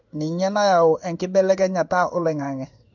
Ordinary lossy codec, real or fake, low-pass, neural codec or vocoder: none; fake; 7.2 kHz; codec, 16 kHz in and 24 kHz out, 1 kbps, XY-Tokenizer